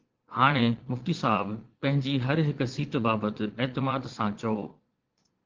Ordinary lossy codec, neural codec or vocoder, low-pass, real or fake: Opus, 16 kbps; vocoder, 22.05 kHz, 80 mel bands, Vocos; 7.2 kHz; fake